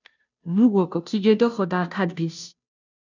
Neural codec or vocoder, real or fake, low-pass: codec, 16 kHz, 0.5 kbps, FunCodec, trained on Chinese and English, 25 frames a second; fake; 7.2 kHz